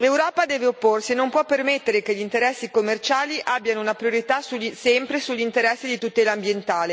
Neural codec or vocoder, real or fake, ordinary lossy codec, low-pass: none; real; none; none